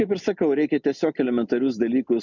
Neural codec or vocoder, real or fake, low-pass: none; real; 7.2 kHz